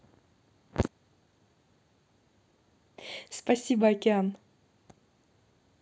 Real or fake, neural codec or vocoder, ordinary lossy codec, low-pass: real; none; none; none